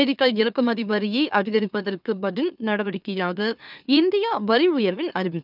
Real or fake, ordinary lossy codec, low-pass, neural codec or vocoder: fake; none; 5.4 kHz; autoencoder, 44.1 kHz, a latent of 192 numbers a frame, MeloTTS